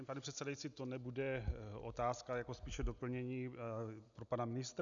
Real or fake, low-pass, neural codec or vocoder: real; 7.2 kHz; none